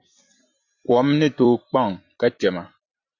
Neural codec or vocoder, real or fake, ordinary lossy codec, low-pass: vocoder, 44.1 kHz, 128 mel bands every 256 samples, BigVGAN v2; fake; Opus, 64 kbps; 7.2 kHz